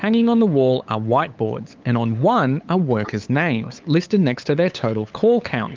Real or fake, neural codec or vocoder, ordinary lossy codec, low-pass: fake; codec, 16 kHz, 8 kbps, FunCodec, trained on LibriTTS, 25 frames a second; Opus, 32 kbps; 7.2 kHz